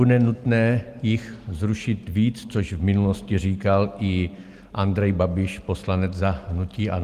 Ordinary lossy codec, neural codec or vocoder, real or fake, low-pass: Opus, 24 kbps; none; real; 14.4 kHz